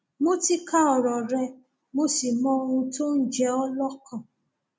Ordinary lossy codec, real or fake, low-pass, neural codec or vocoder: none; real; none; none